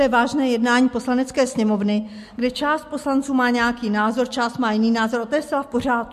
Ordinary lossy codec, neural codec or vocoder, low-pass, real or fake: MP3, 64 kbps; none; 14.4 kHz; real